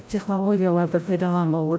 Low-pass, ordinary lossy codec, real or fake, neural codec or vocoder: none; none; fake; codec, 16 kHz, 0.5 kbps, FreqCodec, larger model